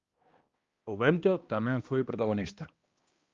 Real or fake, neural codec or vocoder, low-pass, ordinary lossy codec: fake; codec, 16 kHz, 1 kbps, X-Codec, HuBERT features, trained on balanced general audio; 7.2 kHz; Opus, 32 kbps